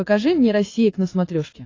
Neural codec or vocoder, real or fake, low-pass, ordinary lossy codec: vocoder, 44.1 kHz, 80 mel bands, Vocos; fake; 7.2 kHz; AAC, 32 kbps